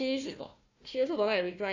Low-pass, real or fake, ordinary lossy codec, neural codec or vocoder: 7.2 kHz; fake; AAC, 48 kbps; codec, 16 kHz, 1 kbps, FunCodec, trained on Chinese and English, 50 frames a second